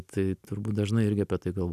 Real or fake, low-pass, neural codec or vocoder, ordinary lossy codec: real; 14.4 kHz; none; MP3, 96 kbps